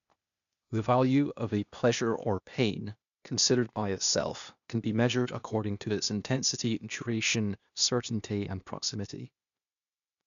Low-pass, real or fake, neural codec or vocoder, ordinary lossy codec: 7.2 kHz; fake; codec, 16 kHz, 0.8 kbps, ZipCodec; none